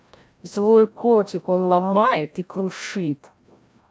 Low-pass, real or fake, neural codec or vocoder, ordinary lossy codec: none; fake; codec, 16 kHz, 0.5 kbps, FreqCodec, larger model; none